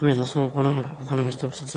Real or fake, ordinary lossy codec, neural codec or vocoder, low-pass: fake; AAC, 48 kbps; autoencoder, 22.05 kHz, a latent of 192 numbers a frame, VITS, trained on one speaker; 9.9 kHz